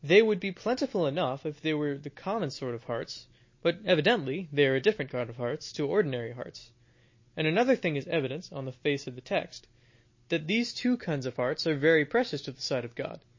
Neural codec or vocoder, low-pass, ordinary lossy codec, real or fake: none; 7.2 kHz; MP3, 32 kbps; real